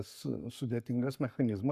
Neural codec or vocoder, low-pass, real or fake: codec, 44.1 kHz, 7.8 kbps, Pupu-Codec; 14.4 kHz; fake